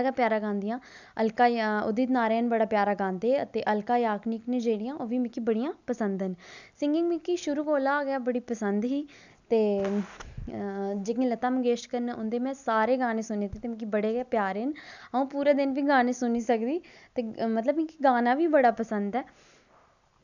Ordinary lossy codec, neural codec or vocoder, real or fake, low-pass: none; none; real; 7.2 kHz